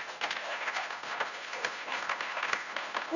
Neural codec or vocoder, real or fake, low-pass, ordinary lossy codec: codec, 16 kHz, 0.5 kbps, FunCodec, trained on Chinese and English, 25 frames a second; fake; 7.2 kHz; none